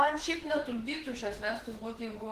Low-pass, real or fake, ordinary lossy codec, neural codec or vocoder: 14.4 kHz; fake; Opus, 16 kbps; autoencoder, 48 kHz, 32 numbers a frame, DAC-VAE, trained on Japanese speech